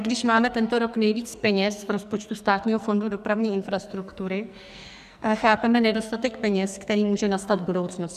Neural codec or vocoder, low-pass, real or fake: codec, 44.1 kHz, 2.6 kbps, SNAC; 14.4 kHz; fake